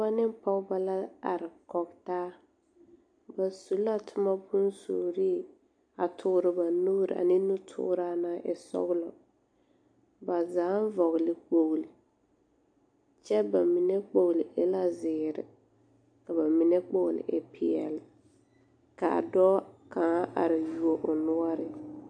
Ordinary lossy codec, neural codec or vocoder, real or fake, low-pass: AAC, 64 kbps; none; real; 9.9 kHz